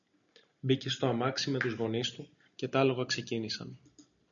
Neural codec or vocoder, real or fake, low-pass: none; real; 7.2 kHz